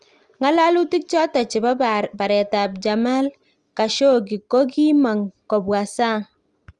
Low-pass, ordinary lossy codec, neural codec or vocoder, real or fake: 10.8 kHz; Opus, 32 kbps; none; real